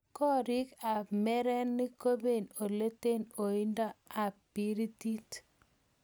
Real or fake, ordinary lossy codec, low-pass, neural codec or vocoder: real; none; none; none